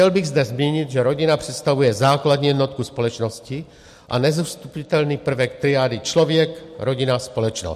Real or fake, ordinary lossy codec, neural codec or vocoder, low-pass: real; MP3, 64 kbps; none; 14.4 kHz